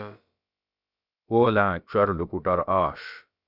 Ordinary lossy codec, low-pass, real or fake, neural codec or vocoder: Opus, 64 kbps; 5.4 kHz; fake; codec, 16 kHz, about 1 kbps, DyCAST, with the encoder's durations